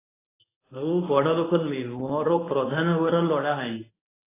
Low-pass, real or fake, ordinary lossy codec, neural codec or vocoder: 3.6 kHz; fake; AAC, 16 kbps; codec, 24 kHz, 0.9 kbps, WavTokenizer, medium speech release version 1